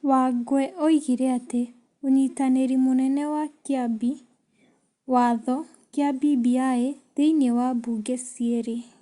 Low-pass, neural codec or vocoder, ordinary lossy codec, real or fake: 10.8 kHz; none; Opus, 64 kbps; real